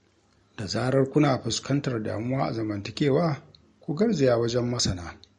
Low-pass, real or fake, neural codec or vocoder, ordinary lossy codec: 19.8 kHz; real; none; MP3, 48 kbps